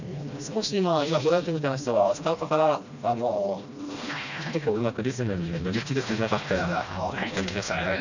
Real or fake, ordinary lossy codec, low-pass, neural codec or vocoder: fake; none; 7.2 kHz; codec, 16 kHz, 1 kbps, FreqCodec, smaller model